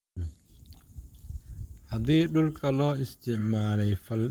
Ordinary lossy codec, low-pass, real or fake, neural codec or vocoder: Opus, 32 kbps; 19.8 kHz; fake; codec, 44.1 kHz, 7.8 kbps, Pupu-Codec